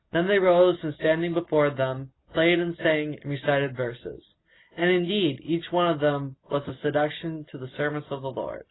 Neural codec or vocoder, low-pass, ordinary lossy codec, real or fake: none; 7.2 kHz; AAC, 16 kbps; real